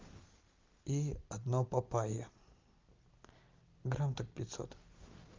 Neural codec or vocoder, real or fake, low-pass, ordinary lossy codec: none; real; 7.2 kHz; Opus, 24 kbps